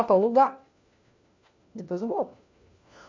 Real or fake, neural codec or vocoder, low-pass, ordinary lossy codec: fake; codec, 16 kHz, 1 kbps, FunCodec, trained on LibriTTS, 50 frames a second; 7.2 kHz; MP3, 48 kbps